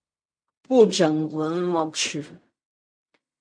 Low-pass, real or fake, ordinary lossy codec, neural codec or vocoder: 9.9 kHz; fake; MP3, 64 kbps; codec, 16 kHz in and 24 kHz out, 0.4 kbps, LongCat-Audio-Codec, fine tuned four codebook decoder